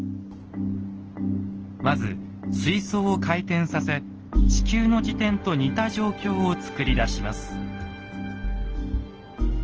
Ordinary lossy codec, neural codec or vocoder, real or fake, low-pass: Opus, 16 kbps; none; real; 7.2 kHz